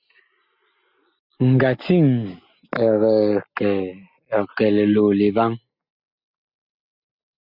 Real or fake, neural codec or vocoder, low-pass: real; none; 5.4 kHz